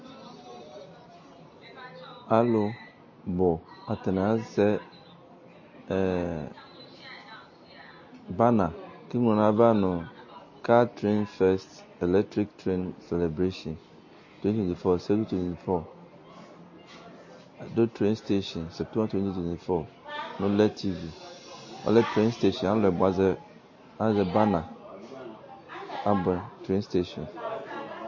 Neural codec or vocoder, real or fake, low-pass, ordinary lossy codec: none; real; 7.2 kHz; MP3, 32 kbps